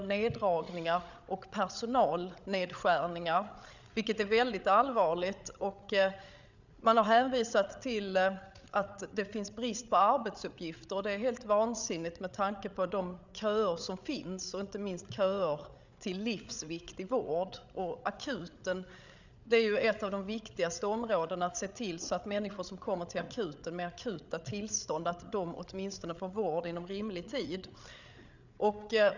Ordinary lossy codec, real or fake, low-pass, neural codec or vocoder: none; fake; 7.2 kHz; codec, 16 kHz, 8 kbps, FreqCodec, larger model